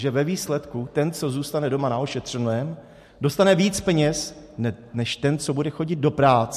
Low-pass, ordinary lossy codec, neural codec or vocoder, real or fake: 14.4 kHz; MP3, 64 kbps; none; real